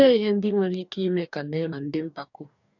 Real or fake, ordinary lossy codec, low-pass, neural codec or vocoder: fake; none; 7.2 kHz; codec, 44.1 kHz, 2.6 kbps, DAC